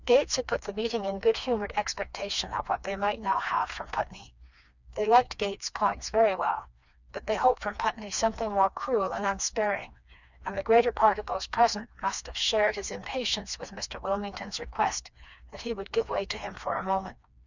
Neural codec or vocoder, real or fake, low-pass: codec, 16 kHz, 2 kbps, FreqCodec, smaller model; fake; 7.2 kHz